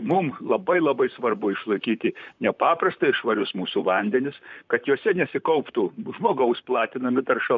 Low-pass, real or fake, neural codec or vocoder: 7.2 kHz; fake; vocoder, 44.1 kHz, 128 mel bands, Pupu-Vocoder